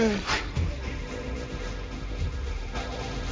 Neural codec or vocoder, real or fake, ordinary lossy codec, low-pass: codec, 16 kHz, 1.1 kbps, Voila-Tokenizer; fake; none; none